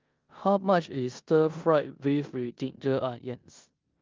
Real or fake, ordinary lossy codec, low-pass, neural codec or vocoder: fake; Opus, 32 kbps; 7.2 kHz; codec, 16 kHz in and 24 kHz out, 0.9 kbps, LongCat-Audio-Codec, four codebook decoder